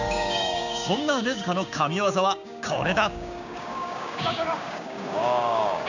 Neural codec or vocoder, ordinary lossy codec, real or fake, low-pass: codec, 16 kHz, 6 kbps, DAC; none; fake; 7.2 kHz